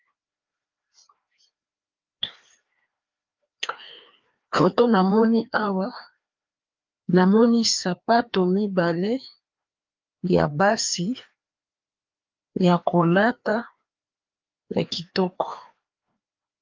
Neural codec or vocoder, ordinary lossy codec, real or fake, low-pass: codec, 16 kHz, 2 kbps, FreqCodec, larger model; Opus, 32 kbps; fake; 7.2 kHz